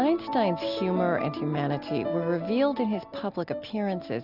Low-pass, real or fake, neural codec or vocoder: 5.4 kHz; real; none